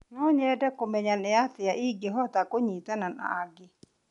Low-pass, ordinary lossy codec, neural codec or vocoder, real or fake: 10.8 kHz; none; none; real